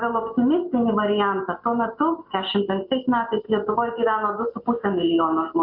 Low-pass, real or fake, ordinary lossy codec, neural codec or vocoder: 5.4 kHz; real; MP3, 48 kbps; none